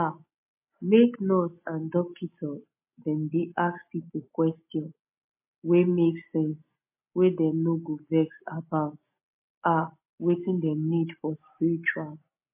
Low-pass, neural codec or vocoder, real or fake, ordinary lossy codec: 3.6 kHz; none; real; MP3, 24 kbps